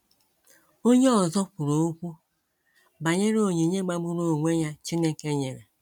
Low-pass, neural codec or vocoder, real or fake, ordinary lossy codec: 19.8 kHz; none; real; none